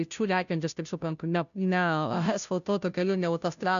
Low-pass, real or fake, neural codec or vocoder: 7.2 kHz; fake; codec, 16 kHz, 0.5 kbps, FunCodec, trained on Chinese and English, 25 frames a second